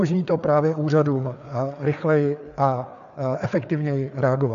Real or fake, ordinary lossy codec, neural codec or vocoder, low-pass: fake; MP3, 96 kbps; codec, 16 kHz, 4 kbps, FreqCodec, larger model; 7.2 kHz